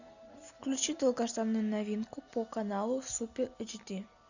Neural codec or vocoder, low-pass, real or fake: none; 7.2 kHz; real